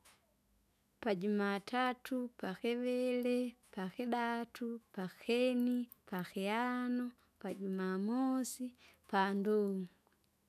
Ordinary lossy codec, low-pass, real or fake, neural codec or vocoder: none; 14.4 kHz; fake; autoencoder, 48 kHz, 128 numbers a frame, DAC-VAE, trained on Japanese speech